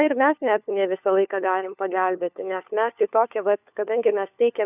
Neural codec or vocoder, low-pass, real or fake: codec, 16 kHz, 4 kbps, FunCodec, trained on LibriTTS, 50 frames a second; 3.6 kHz; fake